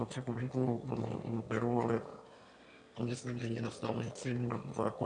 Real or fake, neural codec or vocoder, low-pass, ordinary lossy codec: fake; autoencoder, 22.05 kHz, a latent of 192 numbers a frame, VITS, trained on one speaker; 9.9 kHz; MP3, 96 kbps